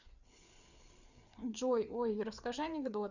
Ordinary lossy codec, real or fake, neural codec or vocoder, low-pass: none; fake; codec, 16 kHz, 4 kbps, FreqCodec, larger model; 7.2 kHz